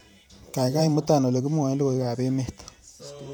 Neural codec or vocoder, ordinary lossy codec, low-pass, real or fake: vocoder, 44.1 kHz, 128 mel bands every 512 samples, BigVGAN v2; none; none; fake